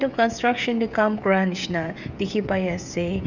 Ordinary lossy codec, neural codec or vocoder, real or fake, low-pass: none; codec, 16 kHz, 16 kbps, FreqCodec, smaller model; fake; 7.2 kHz